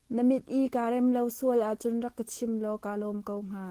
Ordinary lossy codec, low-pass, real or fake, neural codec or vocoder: Opus, 16 kbps; 14.4 kHz; fake; autoencoder, 48 kHz, 128 numbers a frame, DAC-VAE, trained on Japanese speech